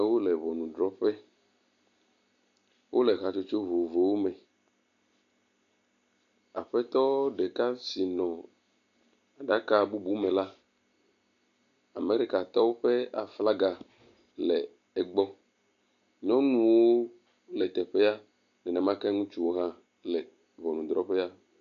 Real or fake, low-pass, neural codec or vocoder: real; 7.2 kHz; none